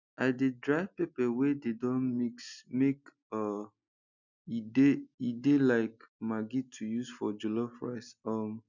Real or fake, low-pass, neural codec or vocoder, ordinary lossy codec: real; 7.2 kHz; none; none